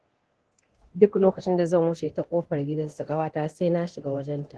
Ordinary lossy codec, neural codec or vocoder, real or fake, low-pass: Opus, 16 kbps; codec, 24 kHz, 0.9 kbps, DualCodec; fake; 10.8 kHz